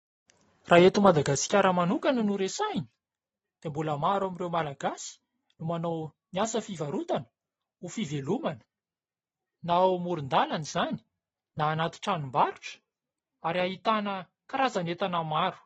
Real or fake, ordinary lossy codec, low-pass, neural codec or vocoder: real; AAC, 24 kbps; 19.8 kHz; none